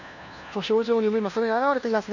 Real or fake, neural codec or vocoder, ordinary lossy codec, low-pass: fake; codec, 16 kHz, 1 kbps, FunCodec, trained on LibriTTS, 50 frames a second; none; 7.2 kHz